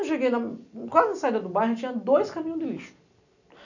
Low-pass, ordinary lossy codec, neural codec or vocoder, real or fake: 7.2 kHz; none; none; real